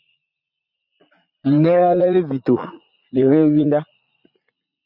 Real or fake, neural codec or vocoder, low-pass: fake; vocoder, 44.1 kHz, 80 mel bands, Vocos; 5.4 kHz